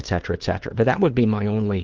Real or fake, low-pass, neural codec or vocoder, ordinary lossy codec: fake; 7.2 kHz; codec, 16 kHz, 2 kbps, FunCodec, trained on LibriTTS, 25 frames a second; Opus, 16 kbps